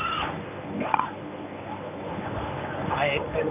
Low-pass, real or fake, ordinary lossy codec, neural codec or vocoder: 3.6 kHz; fake; none; codec, 24 kHz, 0.9 kbps, WavTokenizer, medium speech release version 1